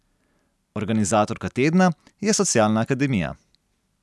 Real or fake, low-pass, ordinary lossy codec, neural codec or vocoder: real; none; none; none